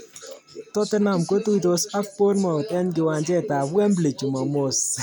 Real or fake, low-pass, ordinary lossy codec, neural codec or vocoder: real; none; none; none